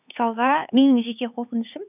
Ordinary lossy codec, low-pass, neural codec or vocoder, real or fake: none; 3.6 kHz; codec, 16 kHz, 4 kbps, X-Codec, HuBERT features, trained on LibriSpeech; fake